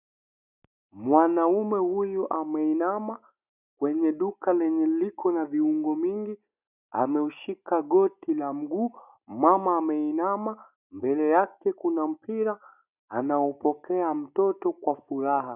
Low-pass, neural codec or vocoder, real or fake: 3.6 kHz; none; real